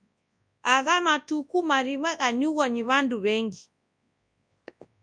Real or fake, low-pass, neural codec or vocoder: fake; 9.9 kHz; codec, 24 kHz, 0.9 kbps, WavTokenizer, large speech release